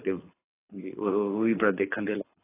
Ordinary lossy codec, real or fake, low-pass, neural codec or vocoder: AAC, 16 kbps; fake; 3.6 kHz; codec, 24 kHz, 6 kbps, HILCodec